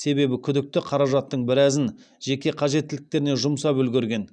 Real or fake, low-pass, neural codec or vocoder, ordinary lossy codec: real; 9.9 kHz; none; none